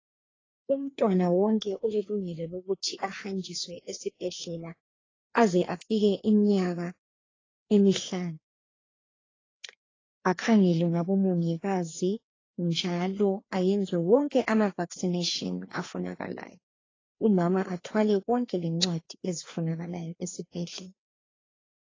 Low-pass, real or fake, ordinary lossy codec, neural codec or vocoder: 7.2 kHz; fake; AAC, 32 kbps; codec, 16 kHz, 2 kbps, FreqCodec, larger model